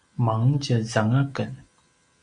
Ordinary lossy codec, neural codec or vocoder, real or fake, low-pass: Opus, 64 kbps; none; real; 9.9 kHz